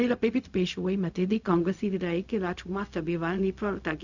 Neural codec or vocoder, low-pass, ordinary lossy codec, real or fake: codec, 16 kHz, 0.4 kbps, LongCat-Audio-Codec; 7.2 kHz; none; fake